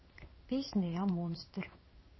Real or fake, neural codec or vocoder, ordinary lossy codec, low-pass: fake; codec, 16 kHz in and 24 kHz out, 1 kbps, XY-Tokenizer; MP3, 24 kbps; 7.2 kHz